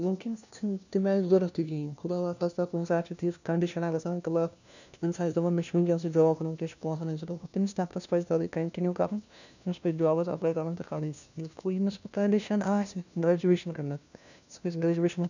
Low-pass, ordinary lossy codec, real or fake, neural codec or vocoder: 7.2 kHz; none; fake; codec, 16 kHz, 1 kbps, FunCodec, trained on LibriTTS, 50 frames a second